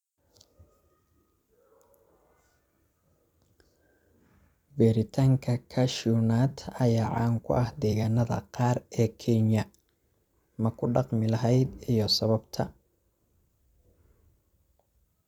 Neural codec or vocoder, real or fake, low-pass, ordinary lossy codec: vocoder, 44.1 kHz, 128 mel bands every 256 samples, BigVGAN v2; fake; 19.8 kHz; none